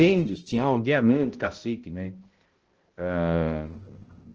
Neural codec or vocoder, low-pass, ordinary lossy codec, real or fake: codec, 16 kHz, 0.5 kbps, X-Codec, HuBERT features, trained on balanced general audio; 7.2 kHz; Opus, 16 kbps; fake